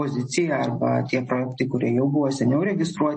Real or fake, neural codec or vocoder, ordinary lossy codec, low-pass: real; none; MP3, 32 kbps; 10.8 kHz